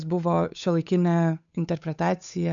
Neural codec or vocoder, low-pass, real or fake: codec, 16 kHz, 8 kbps, FunCodec, trained on Chinese and English, 25 frames a second; 7.2 kHz; fake